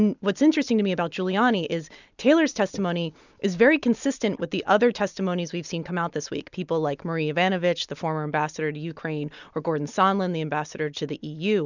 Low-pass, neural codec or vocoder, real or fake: 7.2 kHz; none; real